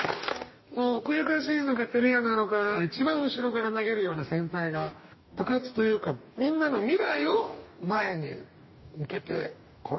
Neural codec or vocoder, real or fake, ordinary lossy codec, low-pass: codec, 44.1 kHz, 2.6 kbps, DAC; fake; MP3, 24 kbps; 7.2 kHz